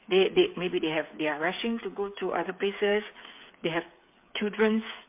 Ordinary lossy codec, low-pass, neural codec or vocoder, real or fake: MP3, 24 kbps; 3.6 kHz; codec, 16 kHz, 8 kbps, FreqCodec, smaller model; fake